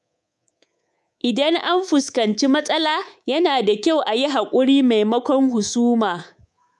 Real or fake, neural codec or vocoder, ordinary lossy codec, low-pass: fake; codec, 24 kHz, 3.1 kbps, DualCodec; none; none